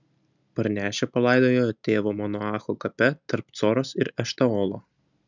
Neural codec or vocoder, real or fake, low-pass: none; real; 7.2 kHz